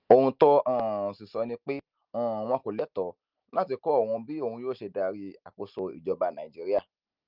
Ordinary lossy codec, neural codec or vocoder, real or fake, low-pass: Opus, 32 kbps; none; real; 5.4 kHz